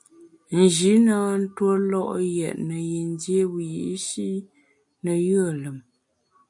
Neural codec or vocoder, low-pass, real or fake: none; 10.8 kHz; real